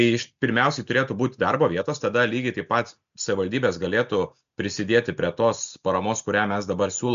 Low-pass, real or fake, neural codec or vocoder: 7.2 kHz; real; none